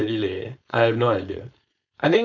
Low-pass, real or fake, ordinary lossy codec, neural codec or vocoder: 7.2 kHz; fake; none; codec, 16 kHz, 4.8 kbps, FACodec